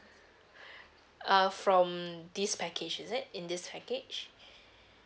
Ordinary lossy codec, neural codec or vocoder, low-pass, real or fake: none; none; none; real